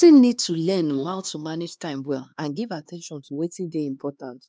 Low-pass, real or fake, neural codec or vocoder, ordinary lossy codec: none; fake; codec, 16 kHz, 2 kbps, X-Codec, HuBERT features, trained on LibriSpeech; none